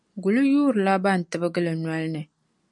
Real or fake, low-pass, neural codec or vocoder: real; 10.8 kHz; none